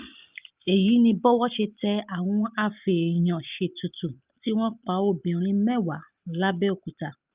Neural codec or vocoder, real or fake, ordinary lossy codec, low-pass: none; real; Opus, 24 kbps; 3.6 kHz